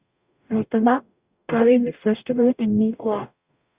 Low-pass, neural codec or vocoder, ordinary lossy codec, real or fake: 3.6 kHz; codec, 44.1 kHz, 0.9 kbps, DAC; Opus, 64 kbps; fake